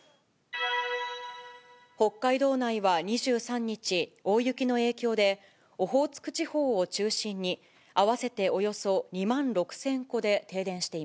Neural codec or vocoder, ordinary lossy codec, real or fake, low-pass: none; none; real; none